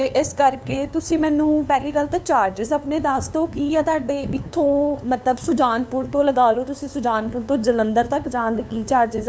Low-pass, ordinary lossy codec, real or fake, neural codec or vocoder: none; none; fake; codec, 16 kHz, 2 kbps, FunCodec, trained on LibriTTS, 25 frames a second